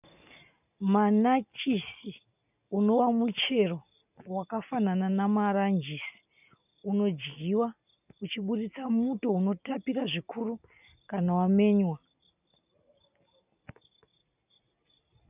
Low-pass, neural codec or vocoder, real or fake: 3.6 kHz; none; real